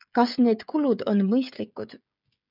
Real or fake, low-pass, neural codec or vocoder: fake; 5.4 kHz; codec, 16 kHz, 16 kbps, FreqCodec, smaller model